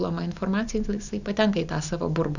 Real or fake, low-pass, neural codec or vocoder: real; 7.2 kHz; none